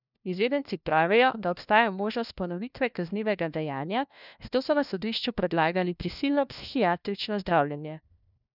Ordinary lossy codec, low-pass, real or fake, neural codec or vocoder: none; 5.4 kHz; fake; codec, 16 kHz, 1 kbps, FunCodec, trained on LibriTTS, 50 frames a second